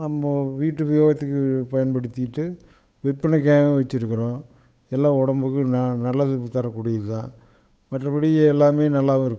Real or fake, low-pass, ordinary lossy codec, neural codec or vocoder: fake; none; none; codec, 16 kHz, 8 kbps, FunCodec, trained on Chinese and English, 25 frames a second